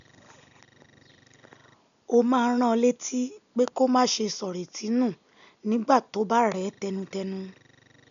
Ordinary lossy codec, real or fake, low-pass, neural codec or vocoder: none; real; 7.2 kHz; none